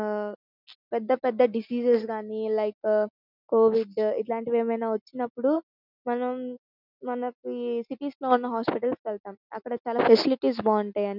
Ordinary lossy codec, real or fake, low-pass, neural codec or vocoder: none; real; 5.4 kHz; none